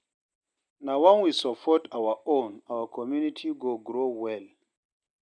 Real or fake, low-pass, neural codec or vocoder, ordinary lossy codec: real; 9.9 kHz; none; none